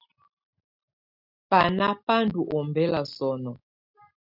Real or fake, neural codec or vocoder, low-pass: real; none; 5.4 kHz